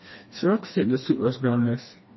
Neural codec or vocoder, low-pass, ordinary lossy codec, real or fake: codec, 16 kHz, 2 kbps, FreqCodec, smaller model; 7.2 kHz; MP3, 24 kbps; fake